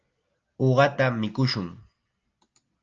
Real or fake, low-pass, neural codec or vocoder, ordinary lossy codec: real; 7.2 kHz; none; Opus, 24 kbps